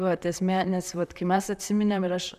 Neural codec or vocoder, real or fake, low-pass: vocoder, 44.1 kHz, 128 mel bands, Pupu-Vocoder; fake; 14.4 kHz